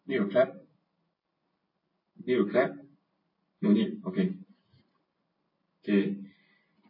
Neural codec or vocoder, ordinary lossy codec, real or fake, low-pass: none; MP3, 24 kbps; real; 5.4 kHz